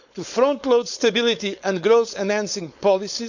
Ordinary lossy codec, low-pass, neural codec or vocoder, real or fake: none; 7.2 kHz; codec, 16 kHz, 4.8 kbps, FACodec; fake